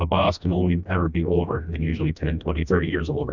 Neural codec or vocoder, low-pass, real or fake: codec, 16 kHz, 1 kbps, FreqCodec, smaller model; 7.2 kHz; fake